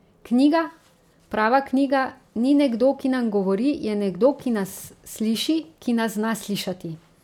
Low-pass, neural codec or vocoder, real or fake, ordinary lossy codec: 19.8 kHz; none; real; none